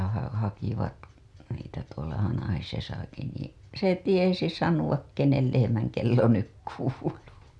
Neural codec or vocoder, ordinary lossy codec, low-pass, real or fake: none; none; none; real